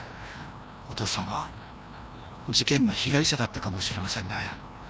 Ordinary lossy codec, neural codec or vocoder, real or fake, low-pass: none; codec, 16 kHz, 1 kbps, FreqCodec, larger model; fake; none